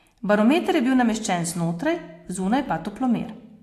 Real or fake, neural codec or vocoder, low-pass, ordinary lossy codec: real; none; 14.4 kHz; AAC, 64 kbps